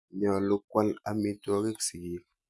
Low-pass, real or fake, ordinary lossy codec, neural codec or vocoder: none; real; none; none